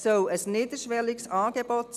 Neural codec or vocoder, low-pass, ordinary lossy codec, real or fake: none; 14.4 kHz; none; real